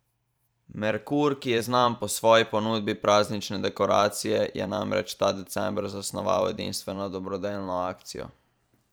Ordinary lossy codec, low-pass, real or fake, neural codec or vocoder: none; none; fake; vocoder, 44.1 kHz, 128 mel bands every 512 samples, BigVGAN v2